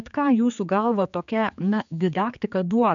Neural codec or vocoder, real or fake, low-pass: codec, 16 kHz, 4 kbps, X-Codec, HuBERT features, trained on general audio; fake; 7.2 kHz